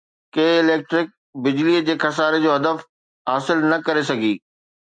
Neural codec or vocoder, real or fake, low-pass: none; real; 9.9 kHz